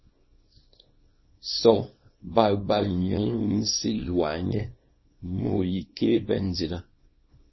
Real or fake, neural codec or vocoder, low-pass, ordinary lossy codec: fake; codec, 24 kHz, 0.9 kbps, WavTokenizer, small release; 7.2 kHz; MP3, 24 kbps